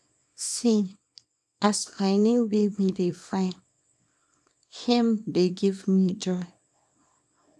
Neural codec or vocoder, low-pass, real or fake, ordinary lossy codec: codec, 24 kHz, 0.9 kbps, WavTokenizer, small release; none; fake; none